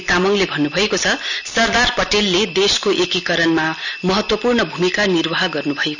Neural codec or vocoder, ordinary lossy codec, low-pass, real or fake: none; none; 7.2 kHz; real